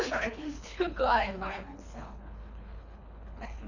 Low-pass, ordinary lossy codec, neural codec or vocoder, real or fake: 7.2 kHz; none; codec, 24 kHz, 3 kbps, HILCodec; fake